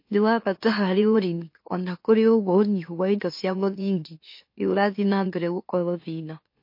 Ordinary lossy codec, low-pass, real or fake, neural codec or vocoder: MP3, 32 kbps; 5.4 kHz; fake; autoencoder, 44.1 kHz, a latent of 192 numbers a frame, MeloTTS